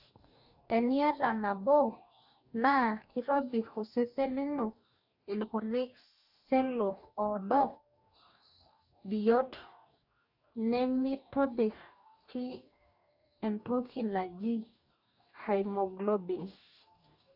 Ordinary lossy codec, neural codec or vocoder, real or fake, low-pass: AAC, 48 kbps; codec, 44.1 kHz, 2.6 kbps, DAC; fake; 5.4 kHz